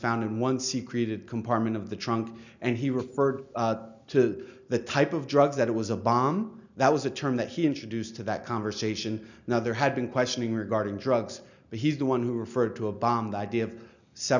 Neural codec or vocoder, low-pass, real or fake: none; 7.2 kHz; real